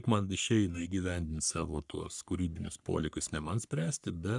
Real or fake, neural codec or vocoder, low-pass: fake; codec, 44.1 kHz, 3.4 kbps, Pupu-Codec; 10.8 kHz